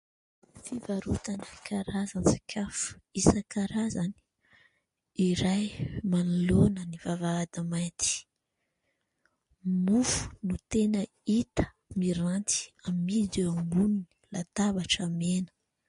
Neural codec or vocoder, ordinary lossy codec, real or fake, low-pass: none; MP3, 48 kbps; real; 10.8 kHz